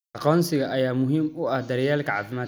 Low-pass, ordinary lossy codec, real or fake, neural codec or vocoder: none; none; real; none